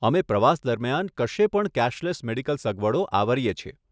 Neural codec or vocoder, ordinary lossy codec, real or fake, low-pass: none; none; real; none